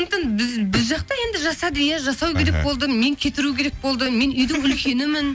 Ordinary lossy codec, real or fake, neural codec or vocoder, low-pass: none; real; none; none